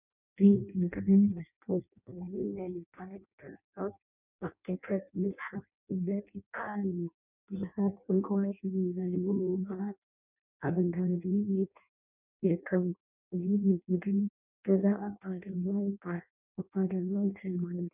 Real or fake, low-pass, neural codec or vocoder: fake; 3.6 kHz; codec, 16 kHz in and 24 kHz out, 0.6 kbps, FireRedTTS-2 codec